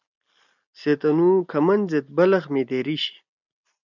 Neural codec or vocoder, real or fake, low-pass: none; real; 7.2 kHz